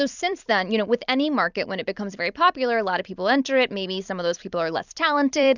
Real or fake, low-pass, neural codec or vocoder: fake; 7.2 kHz; vocoder, 44.1 kHz, 128 mel bands every 256 samples, BigVGAN v2